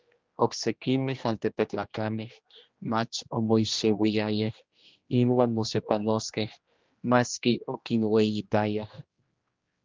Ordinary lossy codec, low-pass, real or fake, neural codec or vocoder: Opus, 32 kbps; 7.2 kHz; fake; codec, 16 kHz, 1 kbps, X-Codec, HuBERT features, trained on general audio